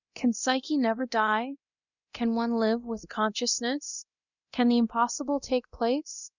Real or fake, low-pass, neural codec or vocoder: fake; 7.2 kHz; codec, 24 kHz, 0.9 kbps, DualCodec